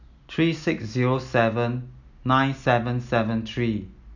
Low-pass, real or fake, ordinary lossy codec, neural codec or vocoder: 7.2 kHz; real; none; none